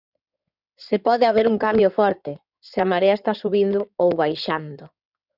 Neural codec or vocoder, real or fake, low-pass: codec, 16 kHz in and 24 kHz out, 2.2 kbps, FireRedTTS-2 codec; fake; 5.4 kHz